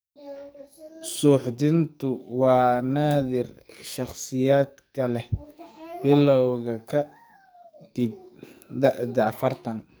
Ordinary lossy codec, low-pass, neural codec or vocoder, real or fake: none; none; codec, 44.1 kHz, 2.6 kbps, SNAC; fake